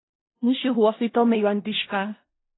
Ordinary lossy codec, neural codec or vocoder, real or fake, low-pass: AAC, 16 kbps; codec, 16 kHz in and 24 kHz out, 0.4 kbps, LongCat-Audio-Codec, four codebook decoder; fake; 7.2 kHz